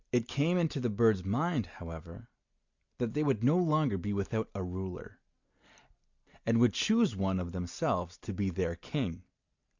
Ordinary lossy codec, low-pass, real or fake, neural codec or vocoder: Opus, 64 kbps; 7.2 kHz; real; none